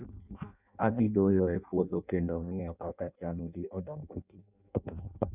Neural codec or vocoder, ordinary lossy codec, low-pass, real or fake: codec, 16 kHz in and 24 kHz out, 0.6 kbps, FireRedTTS-2 codec; none; 3.6 kHz; fake